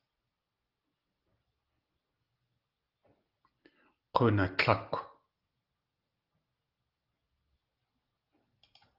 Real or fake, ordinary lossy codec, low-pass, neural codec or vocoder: real; Opus, 32 kbps; 5.4 kHz; none